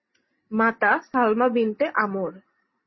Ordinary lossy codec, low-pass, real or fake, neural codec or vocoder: MP3, 24 kbps; 7.2 kHz; real; none